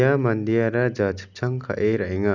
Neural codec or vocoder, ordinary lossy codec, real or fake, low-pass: none; none; real; 7.2 kHz